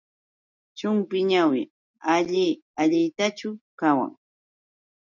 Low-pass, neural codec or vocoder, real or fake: 7.2 kHz; none; real